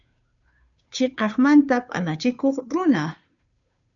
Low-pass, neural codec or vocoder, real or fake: 7.2 kHz; codec, 16 kHz, 2 kbps, FunCodec, trained on Chinese and English, 25 frames a second; fake